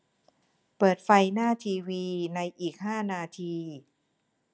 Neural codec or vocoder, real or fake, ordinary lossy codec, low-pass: none; real; none; none